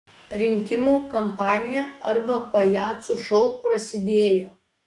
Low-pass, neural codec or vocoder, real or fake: 10.8 kHz; codec, 44.1 kHz, 2.6 kbps, DAC; fake